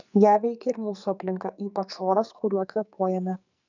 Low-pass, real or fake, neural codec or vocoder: 7.2 kHz; fake; codec, 44.1 kHz, 2.6 kbps, SNAC